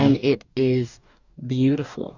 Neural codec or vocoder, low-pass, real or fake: codec, 44.1 kHz, 2.6 kbps, DAC; 7.2 kHz; fake